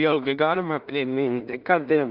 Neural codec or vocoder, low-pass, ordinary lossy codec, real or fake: codec, 16 kHz in and 24 kHz out, 0.4 kbps, LongCat-Audio-Codec, two codebook decoder; 5.4 kHz; Opus, 24 kbps; fake